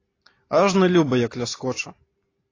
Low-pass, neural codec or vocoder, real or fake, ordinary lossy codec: 7.2 kHz; none; real; AAC, 32 kbps